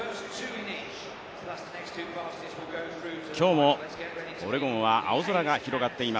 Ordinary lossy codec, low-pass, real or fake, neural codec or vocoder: none; none; real; none